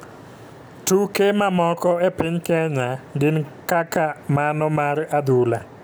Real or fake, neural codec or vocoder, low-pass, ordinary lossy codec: real; none; none; none